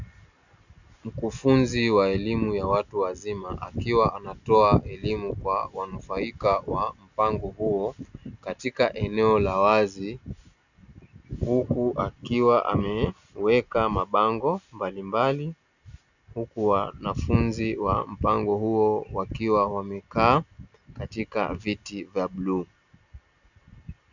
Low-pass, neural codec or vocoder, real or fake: 7.2 kHz; none; real